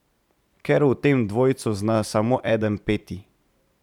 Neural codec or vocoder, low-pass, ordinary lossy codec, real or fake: vocoder, 44.1 kHz, 128 mel bands every 512 samples, BigVGAN v2; 19.8 kHz; none; fake